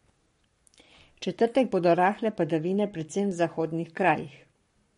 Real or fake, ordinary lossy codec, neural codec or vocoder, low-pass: fake; MP3, 48 kbps; codec, 44.1 kHz, 7.8 kbps, Pupu-Codec; 19.8 kHz